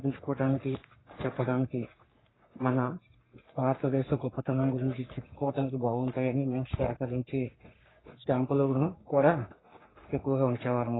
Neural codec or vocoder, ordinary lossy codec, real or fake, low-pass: codec, 44.1 kHz, 3.4 kbps, Pupu-Codec; AAC, 16 kbps; fake; 7.2 kHz